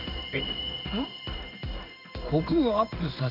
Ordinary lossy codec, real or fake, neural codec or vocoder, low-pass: none; fake; codec, 16 kHz in and 24 kHz out, 1 kbps, XY-Tokenizer; 5.4 kHz